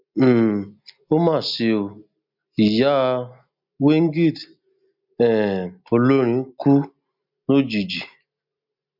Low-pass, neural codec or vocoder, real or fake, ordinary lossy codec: 5.4 kHz; none; real; MP3, 48 kbps